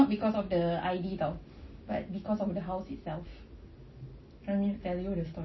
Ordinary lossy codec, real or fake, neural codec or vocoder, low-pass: MP3, 24 kbps; real; none; 7.2 kHz